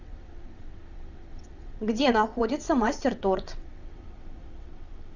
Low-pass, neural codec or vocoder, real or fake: 7.2 kHz; vocoder, 22.05 kHz, 80 mel bands, WaveNeXt; fake